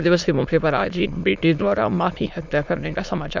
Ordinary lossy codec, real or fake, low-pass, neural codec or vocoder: none; fake; 7.2 kHz; autoencoder, 22.05 kHz, a latent of 192 numbers a frame, VITS, trained on many speakers